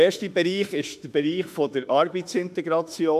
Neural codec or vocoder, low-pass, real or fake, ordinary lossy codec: autoencoder, 48 kHz, 32 numbers a frame, DAC-VAE, trained on Japanese speech; 14.4 kHz; fake; none